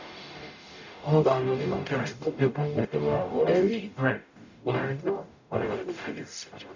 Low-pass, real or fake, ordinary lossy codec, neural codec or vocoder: 7.2 kHz; fake; none; codec, 44.1 kHz, 0.9 kbps, DAC